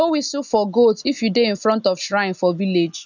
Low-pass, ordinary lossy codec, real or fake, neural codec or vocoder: 7.2 kHz; none; real; none